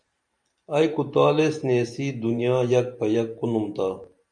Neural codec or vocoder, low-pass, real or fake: vocoder, 44.1 kHz, 128 mel bands every 512 samples, BigVGAN v2; 9.9 kHz; fake